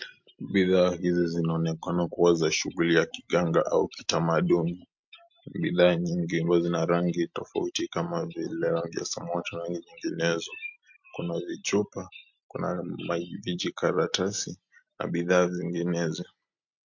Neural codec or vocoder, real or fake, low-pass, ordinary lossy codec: none; real; 7.2 kHz; MP3, 48 kbps